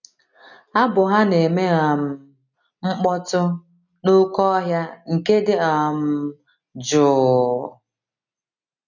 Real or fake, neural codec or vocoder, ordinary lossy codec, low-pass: real; none; none; 7.2 kHz